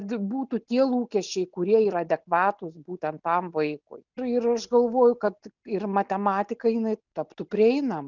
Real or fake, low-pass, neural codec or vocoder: real; 7.2 kHz; none